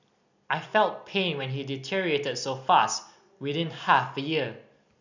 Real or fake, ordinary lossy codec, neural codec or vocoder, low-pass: real; none; none; 7.2 kHz